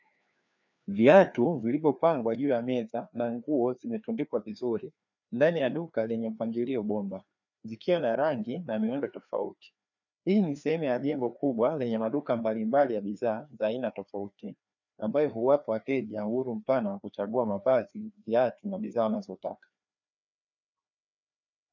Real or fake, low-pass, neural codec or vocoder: fake; 7.2 kHz; codec, 16 kHz, 2 kbps, FreqCodec, larger model